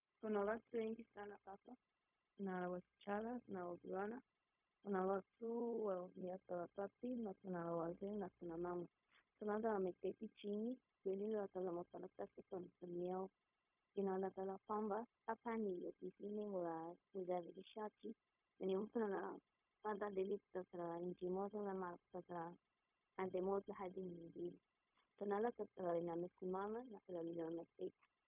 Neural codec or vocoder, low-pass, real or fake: codec, 16 kHz, 0.4 kbps, LongCat-Audio-Codec; 3.6 kHz; fake